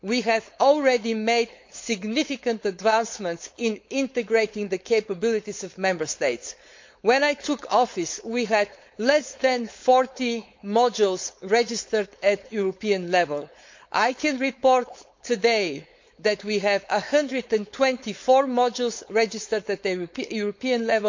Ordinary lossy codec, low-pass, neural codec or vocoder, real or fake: MP3, 48 kbps; 7.2 kHz; codec, 16 kHz, 4.8 kbps, FACodec; fake